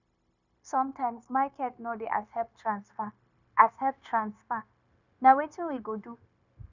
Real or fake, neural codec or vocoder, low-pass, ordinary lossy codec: fake; codec, 16 kHz, 0.9 kbps, LongCat-Audio-Codec; 7.2 kHz; none